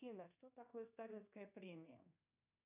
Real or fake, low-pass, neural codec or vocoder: fake; 3.6 kHz; codec, 24 kHz, 1.2 kbps, DualCodec